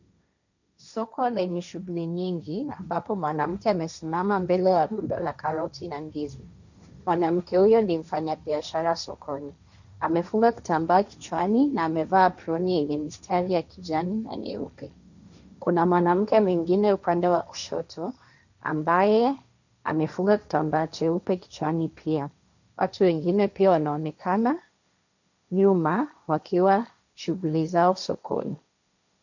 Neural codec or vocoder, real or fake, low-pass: codec, 16 kHz, 1.1 kbps, Voila-Tokenizer; fake; 7.2 kHz